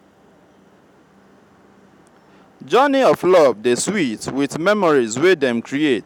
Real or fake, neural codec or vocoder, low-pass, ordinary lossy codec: real; none; 19.8 kHz; none